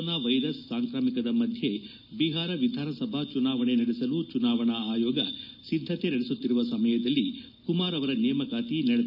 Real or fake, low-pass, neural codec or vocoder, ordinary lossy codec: real; 5.4 kHz; none; none